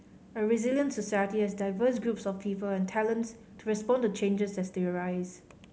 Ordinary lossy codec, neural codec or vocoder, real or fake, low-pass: none; none; real; none